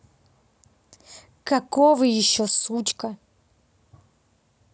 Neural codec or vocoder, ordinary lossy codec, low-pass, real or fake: none; none; none; real